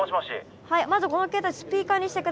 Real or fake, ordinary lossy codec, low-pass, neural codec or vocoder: real; none; none; none